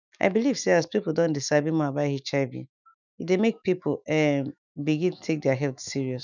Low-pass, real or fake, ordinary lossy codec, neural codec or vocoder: 7.2 kHz; fake; none; autoencoder, 48 kHz, 128 numbers a frame, DAC-VAE, trained on Japanese speech